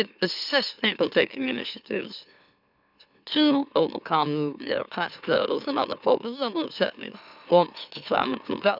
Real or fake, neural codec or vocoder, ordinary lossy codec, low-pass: fake; autoencoder, 44.1 kHz, a latent of 192 numbers a frame, MeloTTS; none; 5.4 kHz